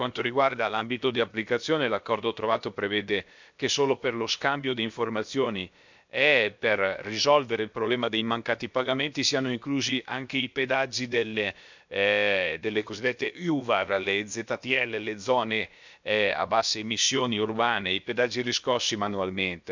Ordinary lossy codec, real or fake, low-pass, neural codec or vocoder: MP3, 64 kbps; fake; 7.2 kHz; codec, 16 kHz, about 1 kbps, DyCAST, with the encoder's durations